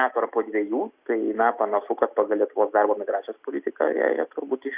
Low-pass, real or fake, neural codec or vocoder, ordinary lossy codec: 3.6 kHz; real; none; Opus, 64 kbps